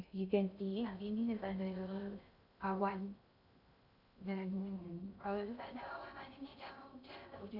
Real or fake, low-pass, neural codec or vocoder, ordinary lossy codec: fake; 5.4 kHz; codec, 16 kHz in and 24 kHz out, 0.6 kbps, FocalCodec, streaming, 2048 codes; none